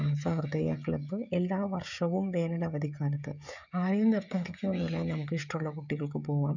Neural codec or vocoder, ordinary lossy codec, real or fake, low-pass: codec, 16 kHz, 16 kbps, FreqCodec, smaller model; none; fake; 7.2 kHz